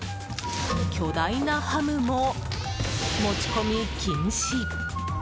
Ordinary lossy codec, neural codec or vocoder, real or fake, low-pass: none; none; real; none